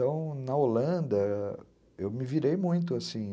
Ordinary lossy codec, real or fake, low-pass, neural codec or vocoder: none; real; none; none